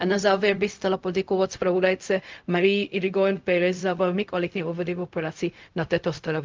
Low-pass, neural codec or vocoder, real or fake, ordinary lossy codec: 7.2 kHz; codec, 16 kHz, 0.4 kbps, LongCat-Audio-Codec; fake; Opus, 32 kbps